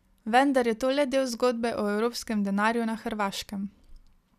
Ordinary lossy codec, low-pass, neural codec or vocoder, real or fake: none; 14.4 kHz; none; real